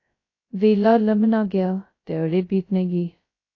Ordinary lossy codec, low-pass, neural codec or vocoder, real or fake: AAC, 32 kbps; 7.2 kHz; codec, 16 kHz, 0.2 kbps, FocalCodec; fake